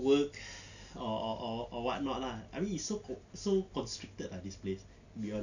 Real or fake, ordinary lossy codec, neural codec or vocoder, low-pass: real; none; none; 7.2 kHz